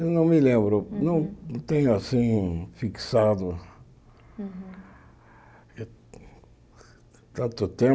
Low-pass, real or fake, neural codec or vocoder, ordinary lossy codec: none; real; none; none